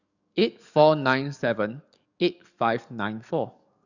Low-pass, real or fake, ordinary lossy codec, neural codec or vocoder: 7.2 kHz; fake; none; codec, 44.1 kHz, 7.8 kbps, DAC